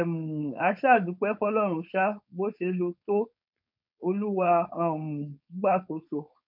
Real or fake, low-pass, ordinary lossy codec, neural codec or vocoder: fake; 5.4 kHz; MP3, 48 kbps; codec, 16 kHz, 4.8 kbps, FACodec